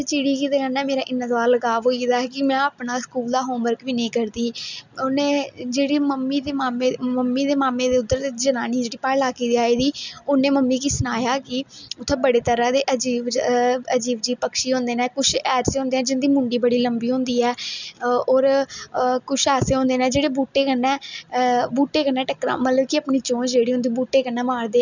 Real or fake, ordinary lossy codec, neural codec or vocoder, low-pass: real; none; none; 7.2 kHz